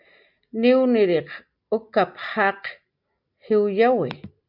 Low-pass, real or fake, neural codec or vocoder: 5.4 kHz; real; none